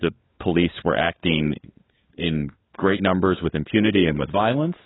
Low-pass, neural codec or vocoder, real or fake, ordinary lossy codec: 7.2 kHz; codec, 16 kHz, 4 kbps, FunCodec, trained on LibriTTS, 50 frames a second; fake; AAC, 16 kbps